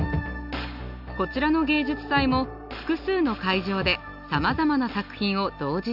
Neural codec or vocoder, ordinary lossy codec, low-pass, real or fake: none; none; 5.4 kHz; real